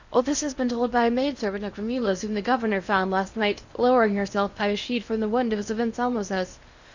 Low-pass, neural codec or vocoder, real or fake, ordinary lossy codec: 7.2 kHz; codec, 16 kHz in and 24 kHz out, 0.8 kbps, FocalCodec, streaming, 65536 codes; fake; Opus, 64 kbps